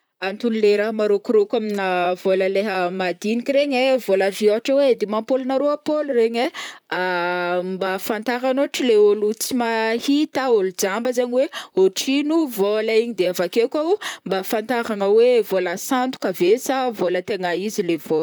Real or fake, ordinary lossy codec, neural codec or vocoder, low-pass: fake; none; vocoder, 44.1 kHz, 128 mel bands, Pupu-Vocoder; none